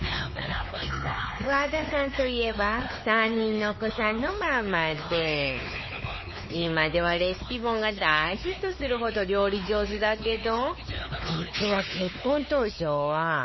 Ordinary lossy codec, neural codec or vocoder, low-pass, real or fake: MP3, 24 kbps; codec, 16 kHz, 4 kbps, X-Codec, WavLM features, trained on Multilingual LibriSpeech; 7.2 kHz; fake